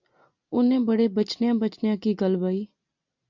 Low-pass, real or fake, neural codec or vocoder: 7.2 kHz; real; none